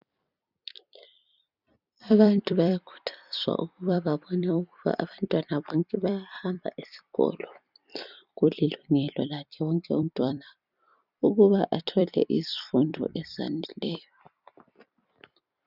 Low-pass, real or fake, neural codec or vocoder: 5.4 kHz; fake; vocoder, 44.1 kHz, 128 mel bands every 256 samples, BigVGAN v2